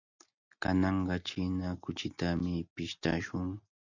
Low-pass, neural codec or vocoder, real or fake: 7.2 kHz; none; real